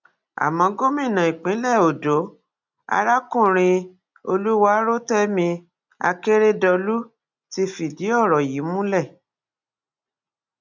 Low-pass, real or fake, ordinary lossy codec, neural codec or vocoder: 7.2 kHz; real; none; none